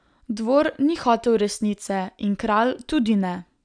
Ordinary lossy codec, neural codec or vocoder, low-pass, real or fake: none; none; 9.9 kHz; real